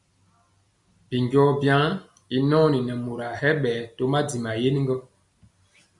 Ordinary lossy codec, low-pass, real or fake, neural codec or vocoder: MP3, 64 kbps; 10.8 kHz; real; none